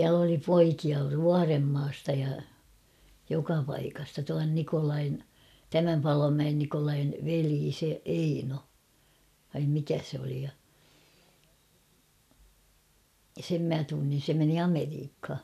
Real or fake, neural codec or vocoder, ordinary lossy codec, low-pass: real; none; none; 14.4 kHz